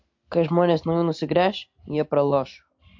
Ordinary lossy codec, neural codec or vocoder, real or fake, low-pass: MP3, 48 kbps; none; real; 7.2 kHz